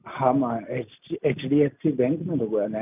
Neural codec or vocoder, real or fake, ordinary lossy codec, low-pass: none; real; none; 3.6 kHz